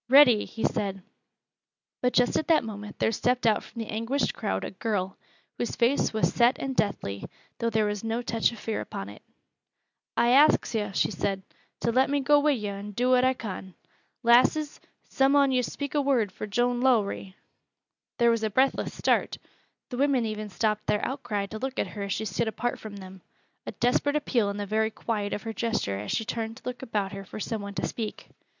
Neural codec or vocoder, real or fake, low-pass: none; real; 7.2 kHz